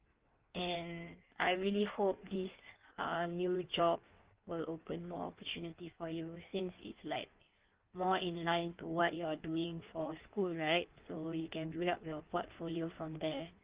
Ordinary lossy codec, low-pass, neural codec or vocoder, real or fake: Opus, 32 kbps; 3.6 kHz; codec, 16 kHz in and 24 kHz out, 1.1 kbps, FireRedTTS-2 codec; fake